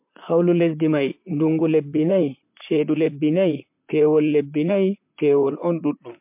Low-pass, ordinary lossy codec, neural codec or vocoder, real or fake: 3.6 kHz; MP3, 32 kbps; vocoder, 44.1 kHz, 128 mel bands, Pupu-Vocoder; fake